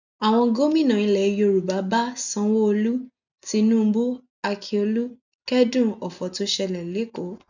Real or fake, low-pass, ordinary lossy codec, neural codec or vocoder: real; 7.2 kHz; none; none